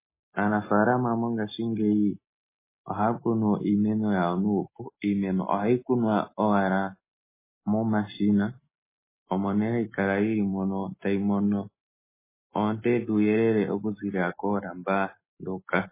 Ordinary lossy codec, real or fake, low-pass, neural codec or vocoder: MP3, 16 kbps; real; 3.6 kHz; none